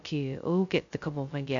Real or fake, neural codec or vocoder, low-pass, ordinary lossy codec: fake; codec, 16 kHz, 0.2 kbps, FocalCodec; 7.2 kHz; AAC, 48 kbps